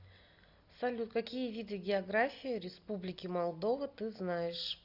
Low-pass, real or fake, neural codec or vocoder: 5.4 kHz; real; none